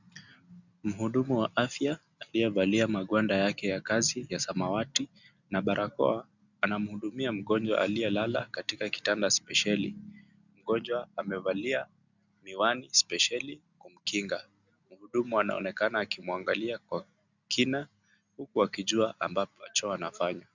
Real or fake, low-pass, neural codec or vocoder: real; 7.2 kHz; none